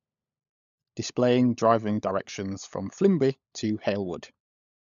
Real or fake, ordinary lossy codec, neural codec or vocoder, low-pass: fake; none; codec, 16 kHz, 16 kbps, FunCodec, trained on LibriTTS, 50 frames a second; 7.2 kHz